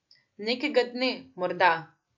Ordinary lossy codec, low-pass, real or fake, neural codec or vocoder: AAC, 48 kbps; 7.2 kHz; real; none